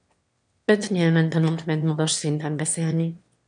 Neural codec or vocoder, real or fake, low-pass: autoencoder, 22.05 kHz, a latent of 192 numbers a frame, VITS, trained on one speaker; fake; 9.9 kHz